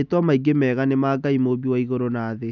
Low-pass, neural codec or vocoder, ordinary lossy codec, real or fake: 7.2 kHz; none; none; real